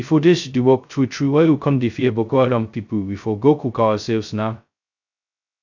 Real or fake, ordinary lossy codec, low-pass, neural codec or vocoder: fake; none; 7.2 kHz; codec, 16 kHz, 0.2 kbps, FocalCodec